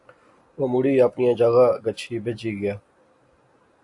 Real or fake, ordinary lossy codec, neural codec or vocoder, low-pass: real; AAC, 64 kbps; none; 10.8 kHz